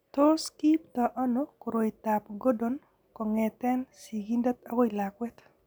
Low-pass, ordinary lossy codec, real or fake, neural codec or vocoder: none; none; real; none